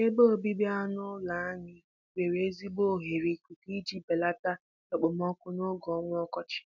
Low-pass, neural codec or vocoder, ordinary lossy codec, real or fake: 7.2 kHz; none; none; real